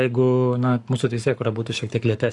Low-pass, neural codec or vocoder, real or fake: 10.8 kHz; codec, 44.1 kHz, 7.8 kbps, Pupu-Codec; fake